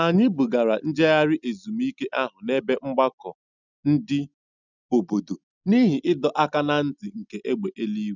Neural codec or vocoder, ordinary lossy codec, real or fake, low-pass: none; none; real; 7.2 kHz